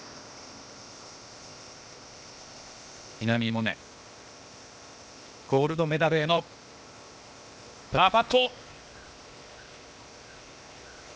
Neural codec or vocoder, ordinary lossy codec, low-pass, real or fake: codec, 16 kHz, 0.8 kbps, ZipCodec; none; none; fake